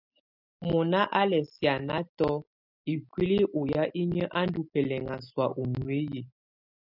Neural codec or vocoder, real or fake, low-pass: none; real; 5.4 kHz